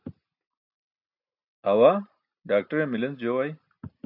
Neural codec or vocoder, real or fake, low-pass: none; real; 5.4 kHz